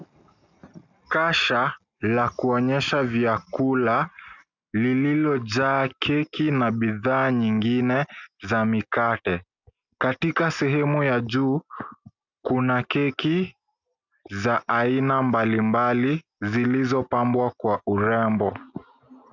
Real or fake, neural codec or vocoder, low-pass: real; none; 7.2 kHz